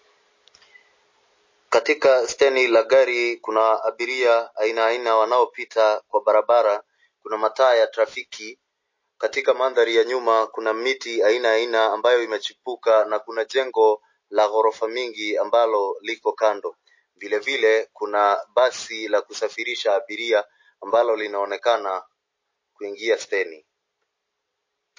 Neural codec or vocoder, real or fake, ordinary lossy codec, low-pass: none; real; MP3, 32 kbps; 7.2 kHz